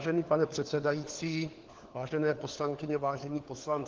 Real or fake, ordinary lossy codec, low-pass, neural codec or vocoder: fake; Opus, 16 kbps; 7.2 kHz; codec, 16 kHz, 4 kbps, FunCodec, trained on LibriTTS, 50 frames a second